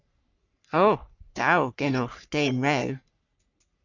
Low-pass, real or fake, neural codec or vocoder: 7.2 kHz; fake; codec, 44.1 kHz, 3.4 kbps, Pupu-Codec